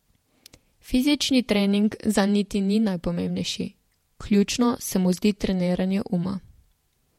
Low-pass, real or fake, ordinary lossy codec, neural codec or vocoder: 19.8 kHz; fake; MP3, 64 kbps; vocoder, 48 kHz, 128 mel bands, Vocos